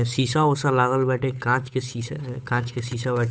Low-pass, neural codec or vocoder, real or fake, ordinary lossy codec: none; codec, 16 kHz, 8 kbps, FunCodec, trained on Chinese and English, 25 frames a second; fake; none